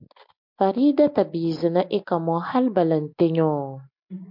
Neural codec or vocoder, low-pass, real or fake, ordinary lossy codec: none; 5.4 kHz; real; MP3, 48 kbps